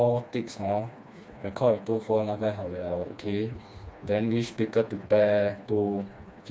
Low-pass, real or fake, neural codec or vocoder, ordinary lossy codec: none; fake; codec, 16 kHz, 2 kbps, FreqCodec, smaller model; none